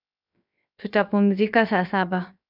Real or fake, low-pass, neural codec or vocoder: fake; 5.4 kHz; codec, 16 kHz, 0.7 kbps, FocalCodec